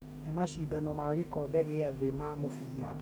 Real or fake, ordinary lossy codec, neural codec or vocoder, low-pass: fake; none; codec, 44.1 kHz, 2.6 kbps, DAC; none